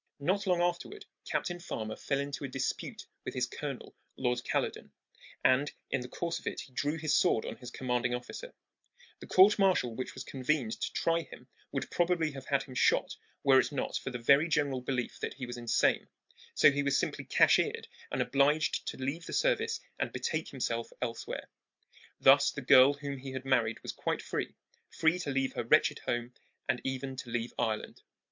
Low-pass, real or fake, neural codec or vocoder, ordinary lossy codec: 7.2 kHz; real; none; MP3, 64 kbps